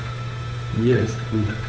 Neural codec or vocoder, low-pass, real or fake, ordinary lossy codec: codec, 16 kHz, 8 kbps, FunCodec, trained on Chinese and English, 25 frames a second; none; fake; none